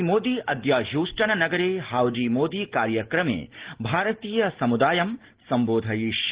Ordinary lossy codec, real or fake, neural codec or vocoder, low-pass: Opus, 16 kbps; real; none; 3.6 kHz